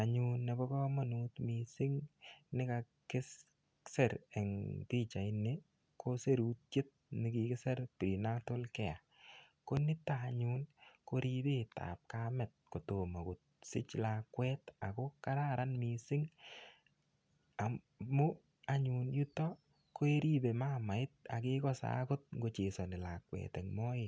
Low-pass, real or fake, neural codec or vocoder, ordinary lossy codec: none; real; none; none